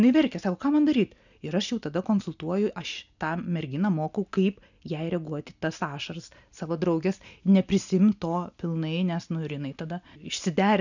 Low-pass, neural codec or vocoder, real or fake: 7.2 kHz; none; real